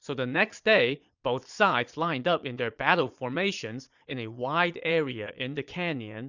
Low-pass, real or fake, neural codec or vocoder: 7.2 kHz; fake; vocoder, 22.05 kHz, 80 mel bands, Vocos